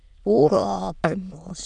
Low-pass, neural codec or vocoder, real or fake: 9.9 kHz; autoencoder, 22.05 kHz, a latent of 192 numbers a frame, VITS, trained on many speakers; fake